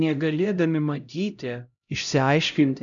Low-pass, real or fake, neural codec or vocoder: 7.2 kHz; fake; codec, 16 kHz, 0.5 kbps, X-Codec, HuBERT features, trained on LibriSpeech